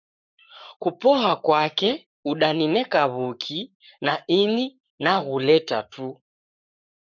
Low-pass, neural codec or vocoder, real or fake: 7.2 kHz; codec, 44.1 kHz, 7.8 kbps, Pupu-Codec; fake